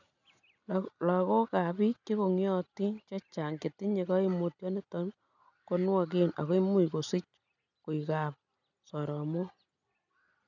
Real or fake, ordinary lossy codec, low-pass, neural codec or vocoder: real; none; 7.2 kHz; none